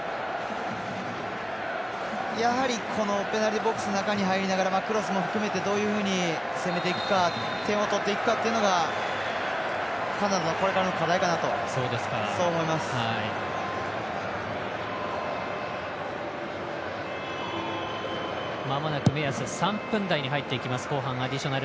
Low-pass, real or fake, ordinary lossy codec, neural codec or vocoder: none; real; none; none